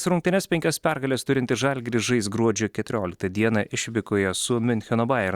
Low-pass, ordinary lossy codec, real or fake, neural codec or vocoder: 19.8 kHz; Opus, 64 kbps; real; none